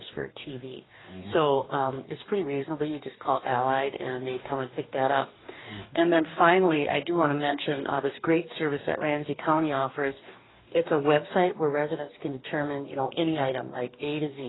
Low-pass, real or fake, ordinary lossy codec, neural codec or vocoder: 7.2 kHz; fake; AAC, 16 kbps; codec, 44.1 kHz, 2.6 kbps, DAC